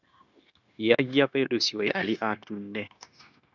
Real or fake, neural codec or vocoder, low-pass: fake; codec, 16 kHz, 0.9 kbps, LongCat-Audio-Codec; 7.2 kHz